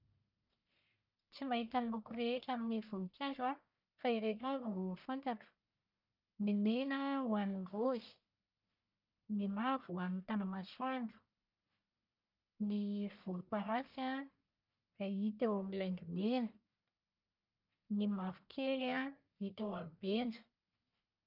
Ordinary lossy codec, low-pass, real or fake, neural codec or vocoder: none; 5.4 kHz; fake; codec, 44.1 kHz, 1.7 kbps, Pupu-Codec